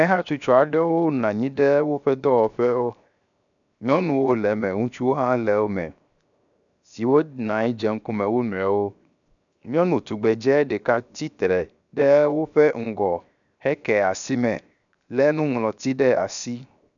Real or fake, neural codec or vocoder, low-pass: fake; codec, 16 kHz, 0.7 kbps, FocalCodec; 7.2 kHz